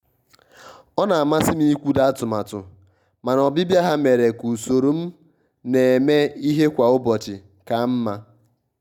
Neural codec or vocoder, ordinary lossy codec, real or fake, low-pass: none; none; real; 19.8 kHz